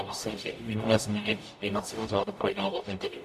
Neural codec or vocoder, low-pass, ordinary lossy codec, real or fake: codec, 44.1 kHz, 0.9 kbps, DAC; 14.4 kHz; MP3, 64 kbps; fake